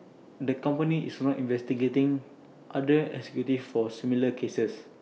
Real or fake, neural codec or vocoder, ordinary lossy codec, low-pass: real; none; none; none